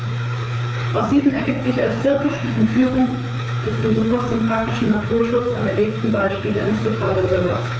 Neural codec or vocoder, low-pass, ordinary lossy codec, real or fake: codec, 16 kHz, 4 kbps, FreqCodec, larger model; none; none; fake